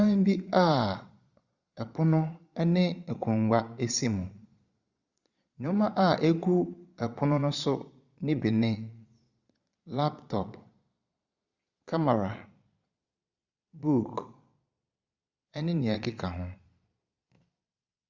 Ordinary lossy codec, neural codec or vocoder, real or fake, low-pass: Opus, 64 kbps; vocoder, 22.05 kHz, 80 mel bands, Vocos; fake; 7.2 kHz